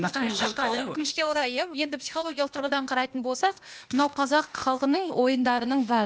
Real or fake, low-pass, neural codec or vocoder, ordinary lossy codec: fake; none; codec, 16 kHz, 0.8 kbps, ZipCodec; none